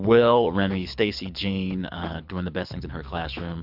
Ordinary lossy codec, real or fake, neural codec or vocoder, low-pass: MP3, 48 kbps; fake; codec, 24 kHz, 6 kbps, HILCodec; 5.4 kHz